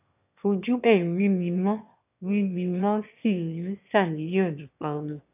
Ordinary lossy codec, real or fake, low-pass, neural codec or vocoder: none; fake; 3.6 kHz; autoencoder, 22.05 kHz, a latent of 192 numbers a frame, VITS, trained on one speaker